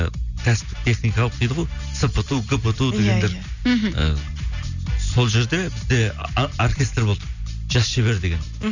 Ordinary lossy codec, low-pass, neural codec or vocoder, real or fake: none; 7.2 kHz; none; real